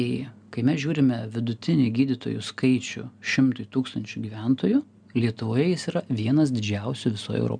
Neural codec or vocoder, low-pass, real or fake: none; 9.9 kHz; real